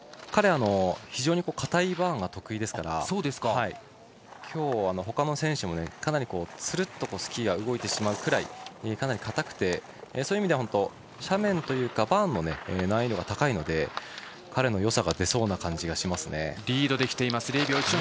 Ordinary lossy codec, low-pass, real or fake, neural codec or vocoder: none; none; real; none